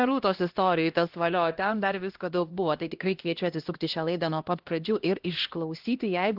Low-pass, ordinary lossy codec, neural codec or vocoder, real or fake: 5.4 kHz; Opus, 16 kbps; codec, 16 kHz, 1 kbps, X-Codec, HuBERT features, trained on LibriSpeech; fake